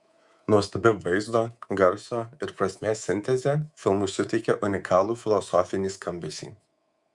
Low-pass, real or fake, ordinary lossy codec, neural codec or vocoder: 10.8 kHz; fake; Opus, 64 kbps; codec, 24 kHz, 3.1 kbps, DualCodec